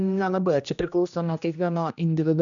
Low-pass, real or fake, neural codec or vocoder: 7.2 kHz; fake; codec, 16 kHz, 1 kbps, X-Codec, HuBERT features, trained on general audio